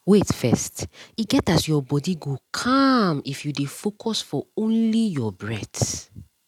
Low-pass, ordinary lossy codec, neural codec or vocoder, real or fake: 19.8 kHz; none; none; real